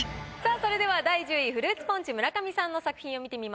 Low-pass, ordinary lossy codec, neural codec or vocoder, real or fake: none; none; none; real